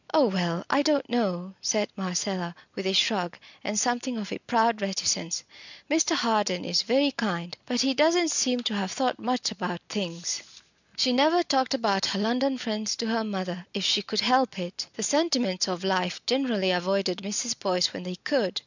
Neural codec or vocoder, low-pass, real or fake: none; 7.2 kHz; real